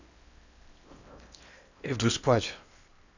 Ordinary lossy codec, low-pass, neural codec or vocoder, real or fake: none; 7.2 kHz; codec, 16 kHz in and 24 kHz out, 0.8 kbps, FocalCodec, streaming, 65536 codes; fake